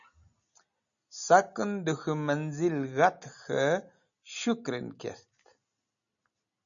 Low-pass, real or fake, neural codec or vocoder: 7.2 kHz; real; none